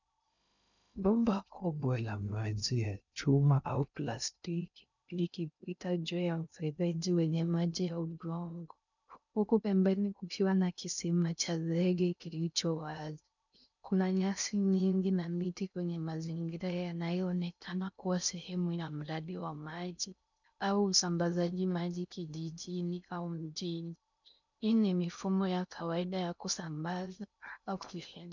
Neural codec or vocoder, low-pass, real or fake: codec, 16 kHz in and 24 kHz out, 0.6 kbps, FocalCodec, streaming, 2048 codes; 7.2 kHz; fake